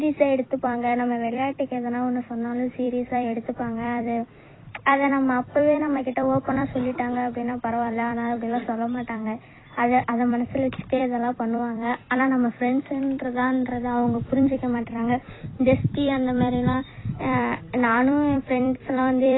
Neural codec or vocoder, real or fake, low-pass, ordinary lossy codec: vocoder, 44.1 kHz, 128 mel bands every 256 samples, BigVGAN v2; fake; 7.2 kHz; AAC, 16 kbps